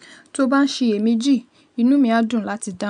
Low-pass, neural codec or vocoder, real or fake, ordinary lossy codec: 9.9 kHz; none; real; none